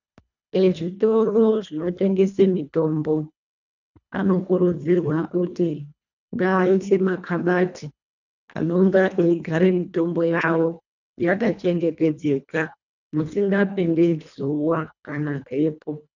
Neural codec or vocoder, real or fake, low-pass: codec, 24 kHz, 1.5 kbps, HILCodec; fake; 7.2 kHz